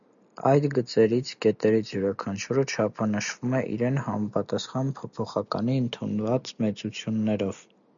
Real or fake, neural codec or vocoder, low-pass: real; none; 7.2 kHz